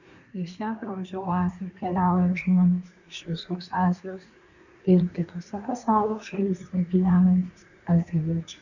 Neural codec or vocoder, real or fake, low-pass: codec, 24 kHz, 1 kbps, SNAC; fake; 7.2 kHz